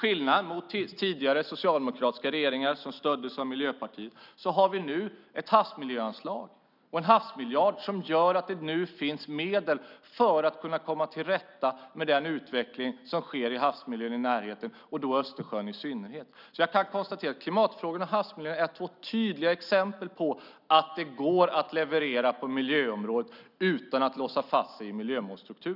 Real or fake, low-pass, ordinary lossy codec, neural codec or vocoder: real; 5.4 kHz; none; none